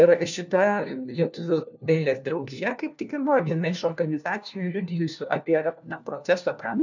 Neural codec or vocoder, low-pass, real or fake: codec, 16 kHz, 1 kbps, FunCodec, trained on LibriTTS, 50 frames a second; 7.2 kHz; fake